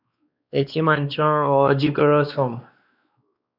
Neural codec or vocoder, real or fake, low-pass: codec, 16 kHz, 2 kbps, X-Codec, WavLM features, trained on Multilingual LibriSpeech; fake; 5.4 kHz